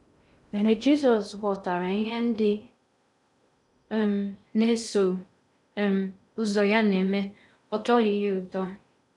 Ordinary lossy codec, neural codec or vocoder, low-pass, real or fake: none; codec, 16 kHz in and 24 kHz out, 0.6 kbps, FocalCodec, streaming, 2048 codes; 10.8 kHz; fake